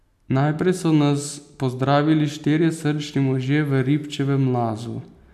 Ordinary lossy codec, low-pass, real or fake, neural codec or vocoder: none; 14.4 kHz; real; none